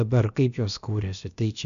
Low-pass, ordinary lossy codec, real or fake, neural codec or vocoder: 7.2 kHz; MP3, 96 kbps; fake; codec, 16 kHz, about 1 kbps, DyCAST, with the encoder's durations